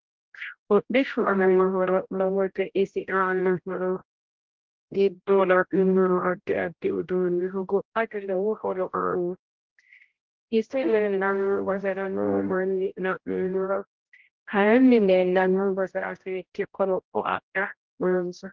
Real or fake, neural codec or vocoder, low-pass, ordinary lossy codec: fake; codec, 16 kHz, 0.5 kbps, X-Codec, HuBERT features, trained on general audio; 7.2 kHz; Opus, 16 kbps